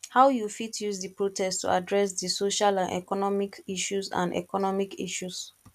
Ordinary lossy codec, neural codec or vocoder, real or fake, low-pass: none; none; real; 14.4 kHz